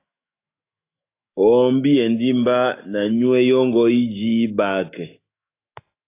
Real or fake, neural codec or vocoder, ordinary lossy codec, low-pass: fake; autoencoder, 48 kHz, 128 numbers a frame, DAC-VAE, trained on Japanese speech; AAC, 24 kbps; 3.6 kHz